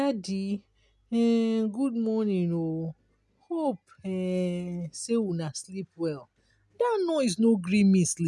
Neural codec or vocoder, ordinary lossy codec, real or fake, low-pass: none; none; real; none